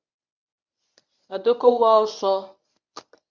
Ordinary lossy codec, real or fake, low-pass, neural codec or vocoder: Opus, 64 kbps; fake; 7.2 kHz; codec, 24 kHz, 0.9 kbps, WavTokenizer, medium speech release version 1